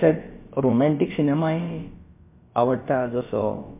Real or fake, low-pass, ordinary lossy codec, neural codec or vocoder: fake; 3.6 kHz; MP3, 24 kbps; codec, 16 kHz, about 1 kbps, DyCAST, with the encoder's durations